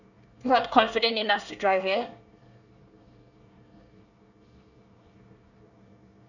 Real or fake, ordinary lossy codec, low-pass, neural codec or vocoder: fake; none; 7.2 kHz; codec, 24 kHz, 1 kbps, SNAC